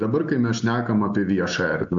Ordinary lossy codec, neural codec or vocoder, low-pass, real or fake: AAC, 64 kbps; none; 7.2 kHz; real